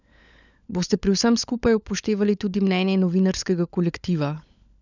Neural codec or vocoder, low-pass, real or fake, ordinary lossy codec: codec, 16 kHz, 16 kbps, FunCodec, trained on LibriTTS, 50 frames a second; 7.2 kHz; fake; none